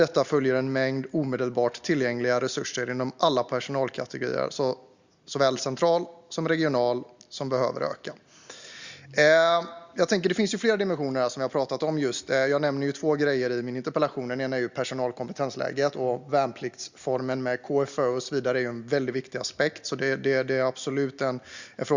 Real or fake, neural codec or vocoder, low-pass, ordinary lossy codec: real; none; 7.2 kHz; Opus, 64 kbps